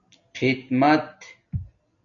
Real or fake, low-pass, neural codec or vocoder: real; 7.2 kHz; none